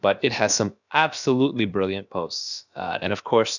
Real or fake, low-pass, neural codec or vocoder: fake; 7.2 kHz; codec, 16 kHz, about 1 kbps, DyCAST, with the encoder's durations